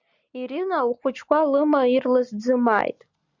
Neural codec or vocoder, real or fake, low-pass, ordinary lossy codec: none; real; 7.2 kHz; Opus, 64 kbps